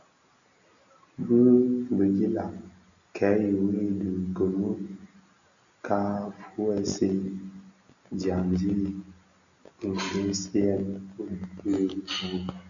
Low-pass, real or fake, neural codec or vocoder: 7.2 kHz; real; none